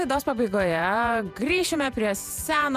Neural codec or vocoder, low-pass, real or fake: vocoder, 48 kHz, 128 mel bands, Vocos; 14.4 kHz; fake